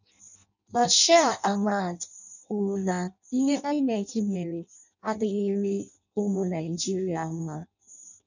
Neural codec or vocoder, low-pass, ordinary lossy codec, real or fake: codec, 16 kHz in and 24 kHz out, 0.6 kbps, FireRedTTS-2 codec; 7.2 kHz; none; fake